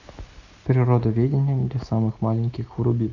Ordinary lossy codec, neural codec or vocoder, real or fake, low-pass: AAC, 48 kbps; none; real; 7.2 kHz